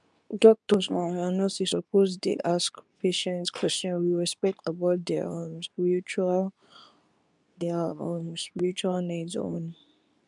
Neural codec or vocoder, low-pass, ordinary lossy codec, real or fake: codec, 24 kHz, 0.9 kbps, WavTokenizer, medium speech release version 2; 10.8 kHz; none; fake